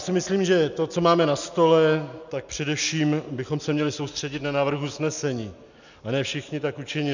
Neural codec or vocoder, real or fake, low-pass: none; real; 7.2 kHz